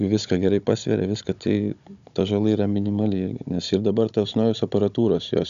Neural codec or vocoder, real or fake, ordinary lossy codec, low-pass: codec, 16 kHz, 16 kbps, FunCodec, trained on Chinese and English, 50 frames a second; fake; MP3, 96 kbps; 7.2 kHz